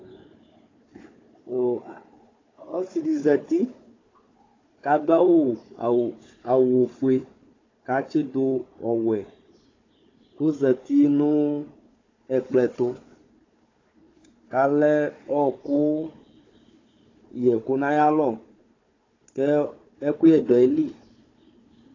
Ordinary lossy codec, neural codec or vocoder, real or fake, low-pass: AAC, 32 kbps; codec, 16 kHz, 4 kbps, FunCodec, trained on Chinese and English, 50 frames a second; fake; 7.2 kHz